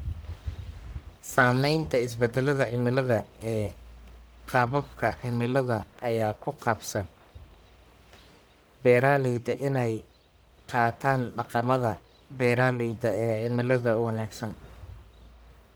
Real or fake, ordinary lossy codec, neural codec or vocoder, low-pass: fake; none; codec, 44.1 kHz, 1.7 kbps, Pupu-Codec; none